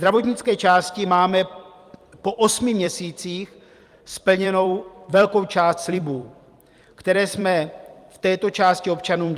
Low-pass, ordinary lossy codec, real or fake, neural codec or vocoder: 14.4 kHz; Opus, 32 kbps; fake; vocoder, 44.1 kHz, 128 mel bands every 256 samples, BigVGAN v2